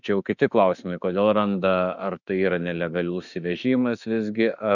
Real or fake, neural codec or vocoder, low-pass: fake; autoencoder, 48 kHz, 32 numbers a frame, DAC-VAE, trained on Japanese speech; 7.2 kHz